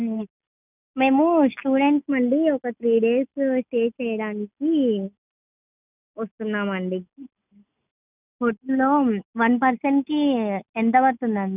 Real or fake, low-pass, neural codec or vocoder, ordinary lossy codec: real; 3.6 kHz; none; none